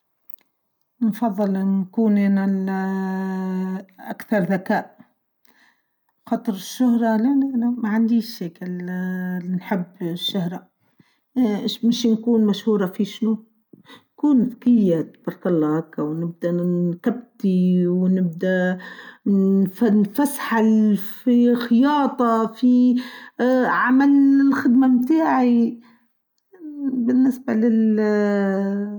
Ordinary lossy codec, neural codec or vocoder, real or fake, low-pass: none; none; real; 19.8 kHz